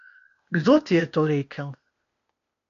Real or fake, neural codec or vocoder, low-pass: fake; codec, 16 kHz, 0.8 kbps, ZipCodec; 7.2 kHz